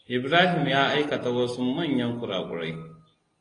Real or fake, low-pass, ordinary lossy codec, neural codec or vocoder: real; 9.9 kHz; AAC, 32 kbps; none